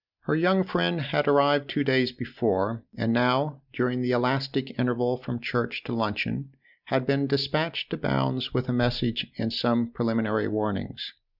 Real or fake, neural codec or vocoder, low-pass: real; none; 5.4 kHz